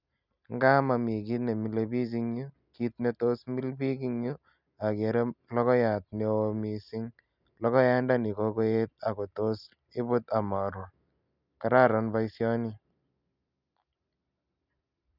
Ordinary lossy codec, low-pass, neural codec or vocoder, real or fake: none; 5.4 kHz; none; real